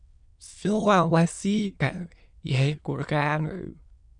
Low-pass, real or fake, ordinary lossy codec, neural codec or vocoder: 9.9 kHz; fake; MP3, 96 kbps; autoencoder, 22.05 kHz, a latent of 192 numbers a frame, VITS, trained on many speakers